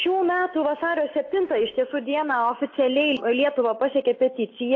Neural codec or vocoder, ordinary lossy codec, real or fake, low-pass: none; AAC, 32 kbps; real; 7.2 kHz